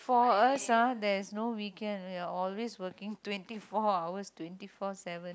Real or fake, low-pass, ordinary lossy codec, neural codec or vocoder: real; none; none; none